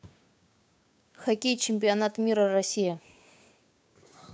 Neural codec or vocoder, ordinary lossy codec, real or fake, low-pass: codec, 16 kHz, 6 kbps, DAC; none; fake; none